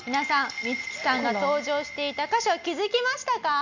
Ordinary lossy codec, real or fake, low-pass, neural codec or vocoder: none; real; 7.2 kHz; none